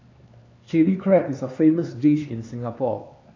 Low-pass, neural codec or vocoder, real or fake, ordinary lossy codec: 7.2 kHz; codec, 16 kHz, 4 kbps, X-Codec, HuBERT features, trained on LibriSpeech; fake; AAC, 32 kbps